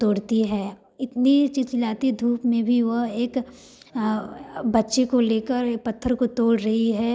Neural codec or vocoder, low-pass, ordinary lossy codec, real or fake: none; none; none; real